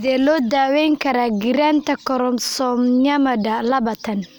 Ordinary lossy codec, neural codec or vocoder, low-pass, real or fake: none; none; none; real